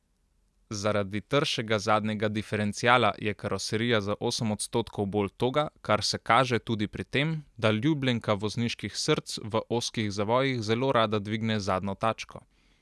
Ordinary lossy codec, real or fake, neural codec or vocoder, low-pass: none; real; none; none